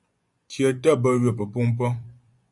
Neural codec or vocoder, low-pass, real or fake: vocoder, 24 kHz, 100 mel bands, Vocos; 10.8 kHz; fake